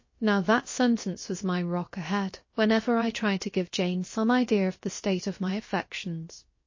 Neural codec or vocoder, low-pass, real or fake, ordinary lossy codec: codec, 16 kHz, about 1 kbps, DyCAST, with the encoder's durations; 7.2 kHz; fake; MP3, 32 kbps